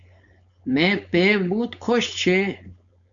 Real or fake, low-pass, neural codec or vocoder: fake; 7.2 kHz; codec, 16 kHz, 4.8 kbps, FACodec